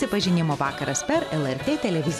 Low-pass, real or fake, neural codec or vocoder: 14.4 kHz; real; none